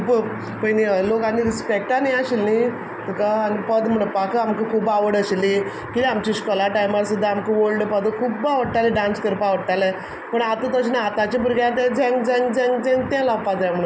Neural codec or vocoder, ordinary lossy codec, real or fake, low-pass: none; none; real; none